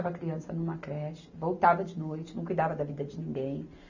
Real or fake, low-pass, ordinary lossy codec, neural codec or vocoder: real; 7.2 kHz; none; none